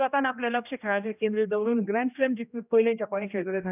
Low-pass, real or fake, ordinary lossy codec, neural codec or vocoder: 3.6 kHz; fake; none; codec, 16 kHz, 1 kbps, X-Codec, HuBERT features, trained on general audio